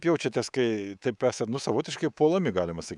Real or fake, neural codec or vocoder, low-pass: fake; codec, 24 kHz, 3.1 kbps, DualCodec; 10.8 kHz